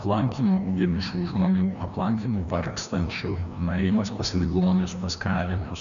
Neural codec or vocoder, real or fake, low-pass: codec, 16 kHz, 1 kbps, FreqCodec, larger model; fake; 7.2 kHz